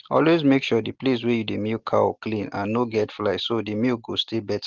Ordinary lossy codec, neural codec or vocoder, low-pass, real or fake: Opus, 16 kbps; none; 7.2 kHz; real